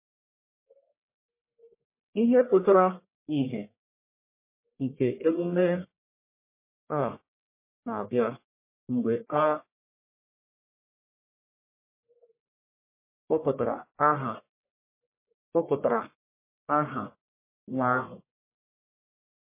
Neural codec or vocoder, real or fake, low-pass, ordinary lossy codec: codec, 44.1 kHz, 1.7 kbps, Pupu-Codec; fake; 3.6 kHz; MP3, 24 kbps